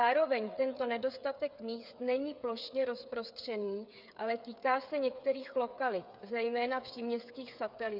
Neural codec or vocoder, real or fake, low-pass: codec, 16 kHz, 8 kbps, FreqCodec, smaller model; fake; 5.4 kHz